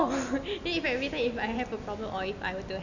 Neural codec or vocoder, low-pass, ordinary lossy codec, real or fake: none; 7.2 kHz; none; real